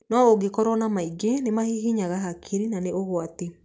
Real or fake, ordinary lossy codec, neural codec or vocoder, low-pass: real; none; none; none